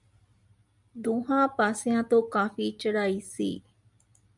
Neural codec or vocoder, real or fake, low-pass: none; real; 10.8 kHz